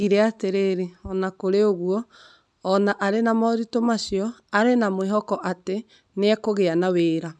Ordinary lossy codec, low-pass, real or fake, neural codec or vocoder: none; none; real; none